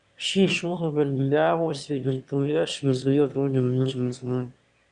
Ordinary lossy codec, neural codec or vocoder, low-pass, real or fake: Opus, 64 kbps; autoencoder, 22.05 kHz, a latent of 192 numbers a frame, VITS, trained on one speaker; 9.9 kHz; fake